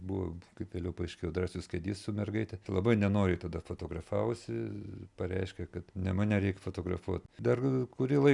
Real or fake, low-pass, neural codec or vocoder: real; 10.8 kHz; none